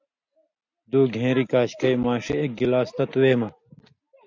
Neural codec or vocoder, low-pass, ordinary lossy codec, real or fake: none; 7.2 kHz; MP3, 64 kbps; real